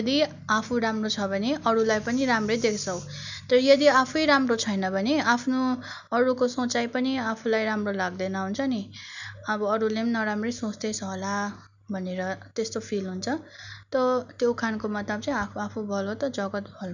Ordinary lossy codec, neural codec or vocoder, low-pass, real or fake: none; none; 7.2 kHz; real